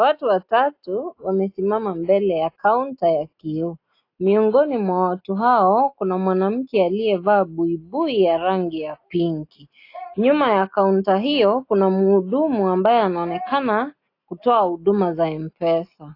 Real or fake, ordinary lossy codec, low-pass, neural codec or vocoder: real; AAC, 32 kbps; 5.4 kHz; none